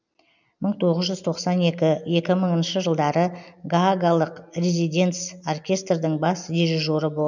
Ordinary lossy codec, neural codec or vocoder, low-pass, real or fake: none; none; 7.2 kHz; real